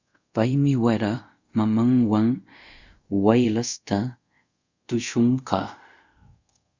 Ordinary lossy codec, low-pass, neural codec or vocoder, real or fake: Opus, 64 kbps; 7.2 kHz; codec, 24 kHz, 0.5 kbps, DualCodec; fake